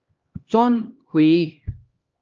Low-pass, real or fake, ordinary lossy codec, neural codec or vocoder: 7.2 kHz; fake; Opus, 24 kbps; codec, 16 kHz, 1 kbps, X-Codec, HuBERT features, trained on LibriSpeech